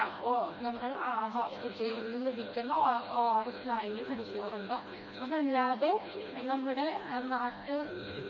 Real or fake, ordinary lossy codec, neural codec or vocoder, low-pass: fake; MP3, 32 kbps; codec, 16 kHz, 1 kbps, FreqCodec, smaller model; 7.2 kHz